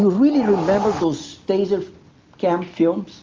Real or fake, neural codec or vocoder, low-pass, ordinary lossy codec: real; none; 7.2 kHz; Opus, 32 kbps